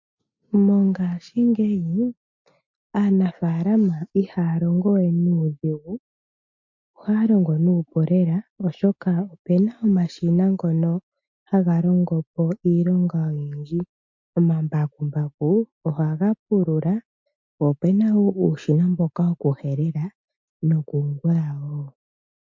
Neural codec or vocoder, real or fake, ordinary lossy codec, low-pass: none; real; MP3, 48 kbps; 7.2 kHz